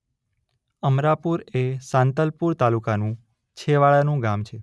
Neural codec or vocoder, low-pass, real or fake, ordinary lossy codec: none; none; real; none